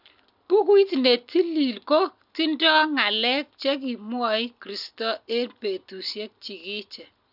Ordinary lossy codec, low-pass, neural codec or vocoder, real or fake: none; 5.4 kHz; none; real